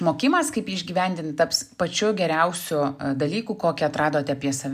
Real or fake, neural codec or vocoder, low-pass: real; none; 14.4 kHz